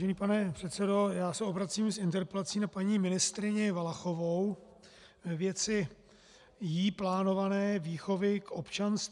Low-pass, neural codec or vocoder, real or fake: 10.8 kHz; none; real